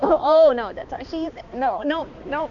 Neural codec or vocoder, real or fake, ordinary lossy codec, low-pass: codec, 16 kHz, 2 kbps, X-Codec, HuBERT features, trained on balanced general audio; fake; none; 7.2 kHz